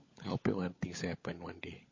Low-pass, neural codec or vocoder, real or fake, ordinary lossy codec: 7.2 kHz; codec, 16 kHz, 16 kbps, FunCodec, trained on LibriTTS, 50 frames a second; fake; MP3, 32 kbps